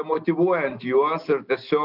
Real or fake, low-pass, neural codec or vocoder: real; 5.4 kHz; none